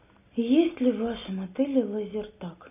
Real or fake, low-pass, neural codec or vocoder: real; 3.6 kHz; none